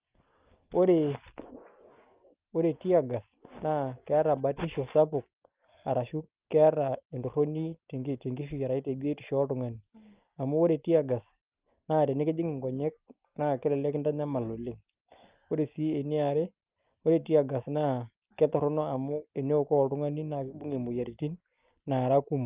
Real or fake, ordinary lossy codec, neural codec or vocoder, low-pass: real; Opus, 24 kbps; none; 3.6 kHz